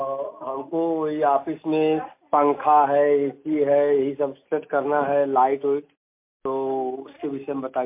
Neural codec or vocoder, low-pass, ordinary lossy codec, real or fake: none; 3.6 kHz; MP3, 24 kbps; real